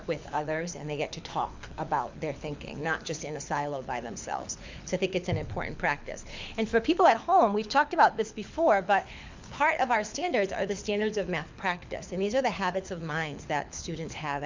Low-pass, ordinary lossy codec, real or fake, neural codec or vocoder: 7.2 kHz; MP3, 64 kbps; fake; codec, 24 kHz, 6 kbps, HILCodec